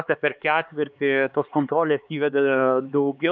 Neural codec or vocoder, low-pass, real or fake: codec, 16 kHz, 4 kbps, X-Codec, HuBERT features, trained on LibriSpeech; 7.2 kHz; fake